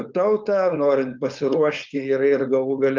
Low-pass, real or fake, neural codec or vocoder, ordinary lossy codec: 7.2 kHz; fake; codec, 16 kHz, 4.8 kbps, FACodec; Opus, 24 kbps